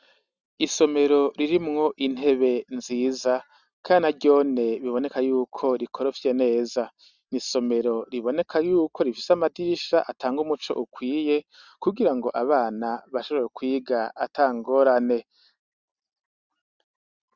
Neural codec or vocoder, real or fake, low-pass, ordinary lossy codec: none; real; 7.2 kHz; Opus, 64 kbps